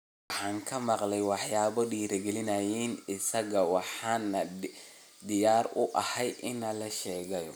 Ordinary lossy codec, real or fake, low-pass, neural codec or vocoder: none; real; none; none